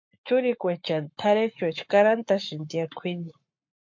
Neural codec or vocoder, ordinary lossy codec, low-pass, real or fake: codec, 44.1 kHz, 7.8 kbps, Pupu-Codec; MP3, 48 kbps; 7.2 kHz; fake